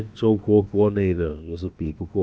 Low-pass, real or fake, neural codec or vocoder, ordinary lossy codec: none; fake; codec, 16 kHz, about 1 kbps, DyCAST, with the encoder's durations; none